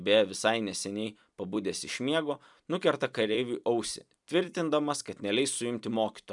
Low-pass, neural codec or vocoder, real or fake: 10.8 kHz; vocoder, 44.1 kHz, 128 mel bands every 256 samples, BigVGAN v2; fake